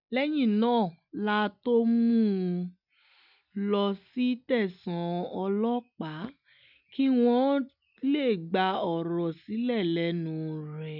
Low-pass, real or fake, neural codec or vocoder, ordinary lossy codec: 5.4 kHz; real; none; none